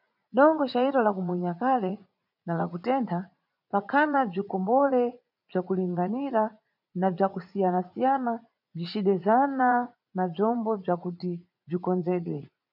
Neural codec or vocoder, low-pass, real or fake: vocoder, 44.1 kHz, 80 mel bands, Vocos; 5.4 kHz; fake